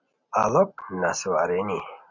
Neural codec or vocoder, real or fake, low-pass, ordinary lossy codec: none; real; 7.2 kHz; MP3, 64 kbps